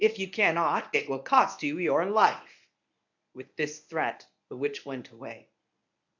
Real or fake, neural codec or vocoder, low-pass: fake; codec, 24 kHz, 0.9 kbps, WavTokenizer, medium speech release version 1; 7.2 kHz